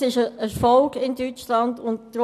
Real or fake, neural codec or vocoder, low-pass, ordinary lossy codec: real; none; 14.4 kHz; none